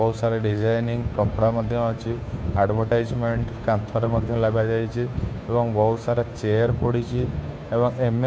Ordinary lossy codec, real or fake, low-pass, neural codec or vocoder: none; fake; none; codec, 16 kHz, 2 kbps, FunCodec, trained on Chinese and English, 25 frames a second